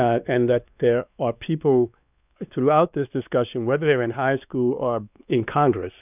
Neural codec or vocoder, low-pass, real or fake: codec, 16 kHz, 2 kbps, X-Codec, WavLM features, trained on Multilingual LibriSpeech; 3.6 kHz; fake